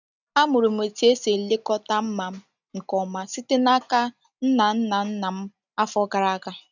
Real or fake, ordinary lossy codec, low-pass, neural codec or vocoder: real; none; 7.2 kHz; none